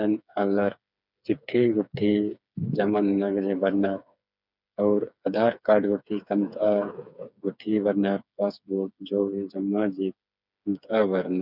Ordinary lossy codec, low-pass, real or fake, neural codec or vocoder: none; 5.4 kHz; fake; codec, 16 kHz, 4 kbps, FreqCodec, smaller model